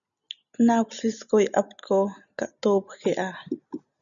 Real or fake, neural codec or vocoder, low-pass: real; none; 7.2 kHz